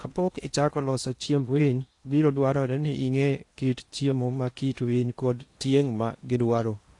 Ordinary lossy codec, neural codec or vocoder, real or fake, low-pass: AAC, 48 kbps; codec, 16 kHz in and 24 kHz out, 0.8 kbps, FocalCodec, streaming, 65536 codes; fake; 10.8 kHz